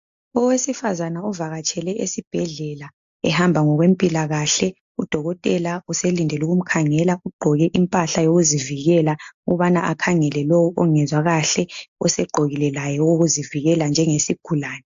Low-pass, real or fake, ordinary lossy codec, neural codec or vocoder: 7.2 kHz; real; AAC, 64 kbps; none